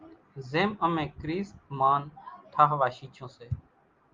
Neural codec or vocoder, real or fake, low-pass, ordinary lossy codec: none; real; 7.2 kHz; Opus, 32 kbps